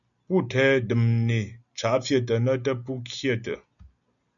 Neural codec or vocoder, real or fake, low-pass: none; real; 7.2 kHz